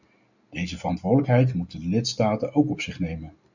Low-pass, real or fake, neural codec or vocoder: 7.2 kHz; real; none